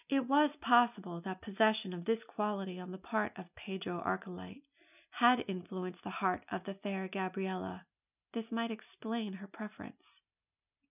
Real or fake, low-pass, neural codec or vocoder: real; 3.6 kHz; none